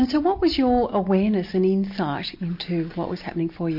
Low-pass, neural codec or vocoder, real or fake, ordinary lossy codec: 5.4 kHz; none; real; MP3, 32 kbps